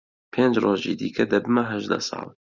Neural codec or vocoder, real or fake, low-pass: none; real; 7.2 kHz